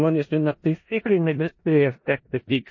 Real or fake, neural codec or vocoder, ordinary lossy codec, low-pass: fake; codec, 16 kHz in and 24 kHz out, 0.4 kbps, LongCat-Audio-Codec, four codebook decoder; MP3, 32 kbps; 7.2 kHz